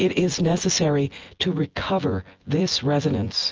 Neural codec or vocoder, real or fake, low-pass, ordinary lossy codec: vocoder, 24 kHz, 100 mel bands, Vocos; fake; 7.2 kHz; Opus, 24 kbps